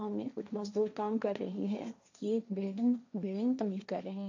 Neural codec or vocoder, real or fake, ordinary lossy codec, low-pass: codec, 16 kHz, 1.1 kbps, Voila-Tokenizer; fake; AAC, 48 kbps; 7.2 kHz